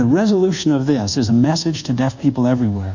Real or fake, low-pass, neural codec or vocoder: fake; 7.2 kHz; codec, 24 kHz, 1.2 kbps, DualCodec